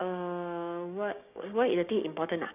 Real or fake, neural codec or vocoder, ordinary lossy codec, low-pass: fake; codec, 16 kHz, 6 kbps, DAC; none; 3.6 kHz